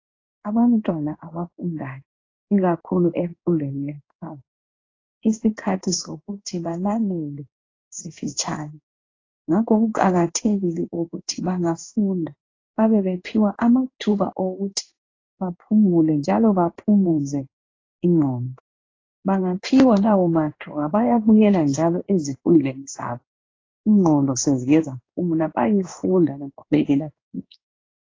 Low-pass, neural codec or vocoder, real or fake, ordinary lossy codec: 7.2 kHz; codec, 16 kHz in and 24 kHz out, 1 kbps, XY-Tokenizer; fake; AAC, 32 kbps